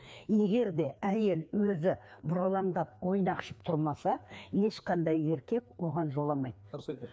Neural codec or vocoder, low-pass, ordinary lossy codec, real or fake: codec, 16 kHz, 2 kbps, FreqCodec, larger model; none; none; fake